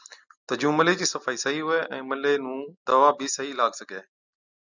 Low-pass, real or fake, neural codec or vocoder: 7.2 kHz; real; none